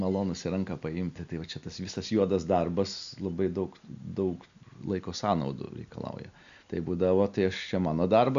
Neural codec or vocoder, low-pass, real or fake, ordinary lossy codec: none; 7.2 kHz; real; AAC, 96 kbps